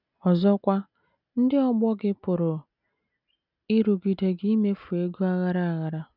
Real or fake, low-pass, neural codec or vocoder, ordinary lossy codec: real; 5.4 kHz; none; none